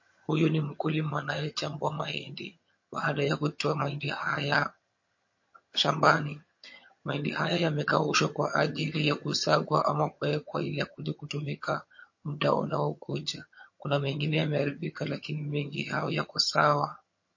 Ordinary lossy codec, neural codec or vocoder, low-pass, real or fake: MP3, 32 kbps; vocoder, 22.05 kHz, 80 mel bands, HiFi-GAN; 7.2 kHz; fake